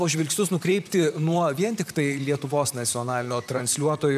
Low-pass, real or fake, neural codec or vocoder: 14.4 kHz; fake; vocoder, 44.1 kHz, 128 mel bands, Pupu-Vocoder